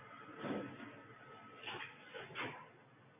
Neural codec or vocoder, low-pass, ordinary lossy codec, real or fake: none; 3.6 kHz; AAC, 32 kbps; real